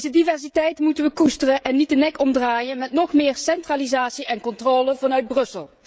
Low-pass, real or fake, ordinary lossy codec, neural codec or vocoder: none; fake; none; codec, 16 kHz, 16 kbps, FreqCodec, smaller model